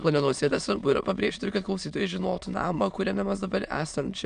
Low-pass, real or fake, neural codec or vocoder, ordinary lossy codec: 9.9 kHz; fake; autoencoder, 22.05 kHz, a latent of 192 numbers a frame, VITS, trained on many speakers; MP3, 96 kbps